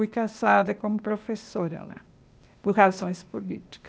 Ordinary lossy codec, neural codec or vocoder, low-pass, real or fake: none; codec, 16 kHz, 0.8 kbps, ZipCodec; none; fake